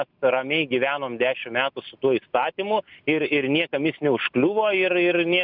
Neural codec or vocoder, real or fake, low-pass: none; real; 5.4 kHz